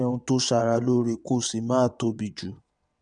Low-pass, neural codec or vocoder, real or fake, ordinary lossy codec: 9.9 kHz; vocoder, 22.05 kHz, 80 mel bands, WaveNeXt; fake; none